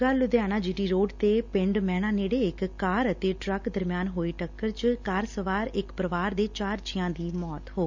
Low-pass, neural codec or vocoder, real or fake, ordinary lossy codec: 7.2 kHz; none; real; none